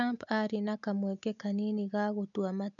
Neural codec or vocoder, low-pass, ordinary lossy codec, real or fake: none; 7.2 kHz; none; real